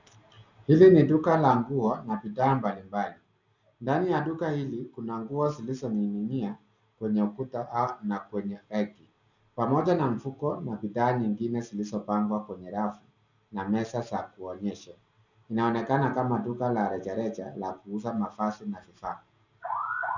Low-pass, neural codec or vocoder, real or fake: 7.2 kHz; none; real